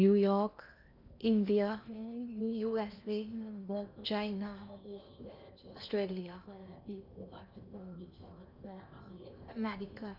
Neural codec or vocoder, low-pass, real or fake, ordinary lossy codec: codec, 16 kHz in and 24 kHz out, 0.8 kbps, FocalCodec, streaming, 65536 codes; 5.4 kHz; fake; none